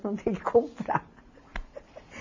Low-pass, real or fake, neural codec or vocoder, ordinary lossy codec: 7.2 kHz; real; none; MP3, 32 kbps